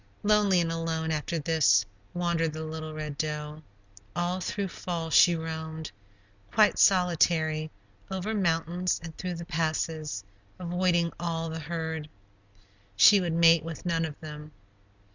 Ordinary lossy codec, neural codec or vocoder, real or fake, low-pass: Opus, 64 kbps; none; real; 7.2 kHz